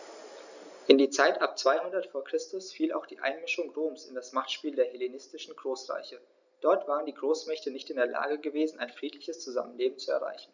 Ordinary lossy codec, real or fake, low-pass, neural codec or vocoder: none; real; 7.2 kHz; none